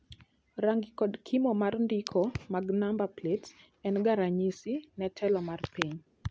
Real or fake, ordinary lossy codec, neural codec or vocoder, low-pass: real; none; none; none